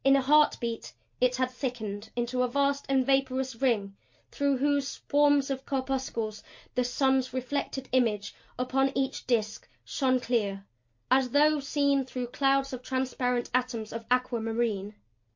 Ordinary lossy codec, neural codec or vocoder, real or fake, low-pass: MP3, 48 kbps; none; real; 7.2 kHz